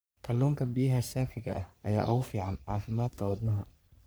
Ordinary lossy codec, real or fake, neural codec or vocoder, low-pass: none; fake; codec, 44.1 kHz, 3.4 kbps, Pupu-Codec; none